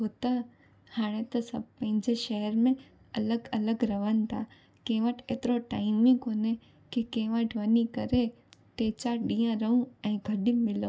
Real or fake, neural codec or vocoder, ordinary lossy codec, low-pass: real; none; none; none